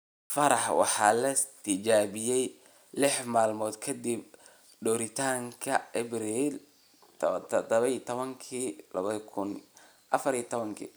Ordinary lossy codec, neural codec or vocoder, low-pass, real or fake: none; none; none; real